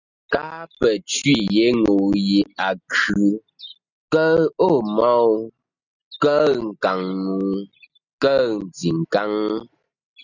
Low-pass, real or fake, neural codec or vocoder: 7.2 kHz; real; none